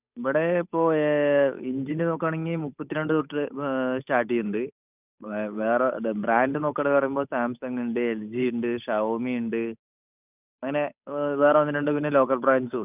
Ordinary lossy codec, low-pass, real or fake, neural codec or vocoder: none; 3.6 kHz; fake; codec, 16 kHz, 8 kbps, FunCodec, trained on Chinese and English, 25 frames a second